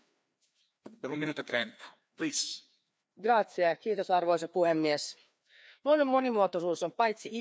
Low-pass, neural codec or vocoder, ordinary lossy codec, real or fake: none; codec, 16 kHz, 2 kbps, FreqCodec, larger model; none; fake